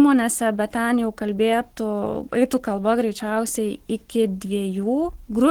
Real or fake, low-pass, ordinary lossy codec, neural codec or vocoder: fake; 19.8 kHz; Opus, 16 kbps; codec, 44.1 kHz, 7.8 kbps, Pupu-Codec